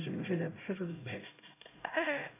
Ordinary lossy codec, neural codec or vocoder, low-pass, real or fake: none; codec, 16 kHz, 0.5 kbps, X-Codec, HuBERT features, trained on LibriSpeech; 3.6 kHz; fake